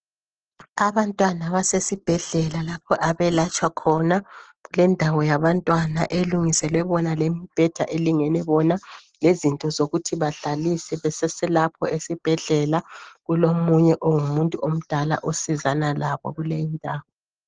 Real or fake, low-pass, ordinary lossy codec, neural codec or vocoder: real; 7.2 kHz; Opus, 16 kbps; none